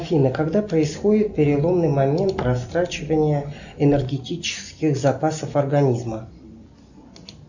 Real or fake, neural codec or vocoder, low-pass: real; none; 7.2 kHz